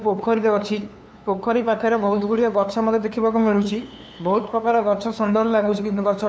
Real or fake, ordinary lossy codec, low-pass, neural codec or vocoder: fake; none; none; codec, 16 kHz, 2 kbps, FunCodec, trained on LibriTTS, 25 frames a second